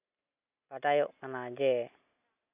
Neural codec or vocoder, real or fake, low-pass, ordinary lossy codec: none; real; 3.6 kHz; none